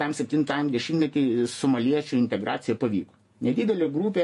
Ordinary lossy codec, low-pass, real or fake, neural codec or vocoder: MP3, 48 kbps; 14.4 kHz; fake; vocoder, 48 kHz, 128 mel bands, Vocos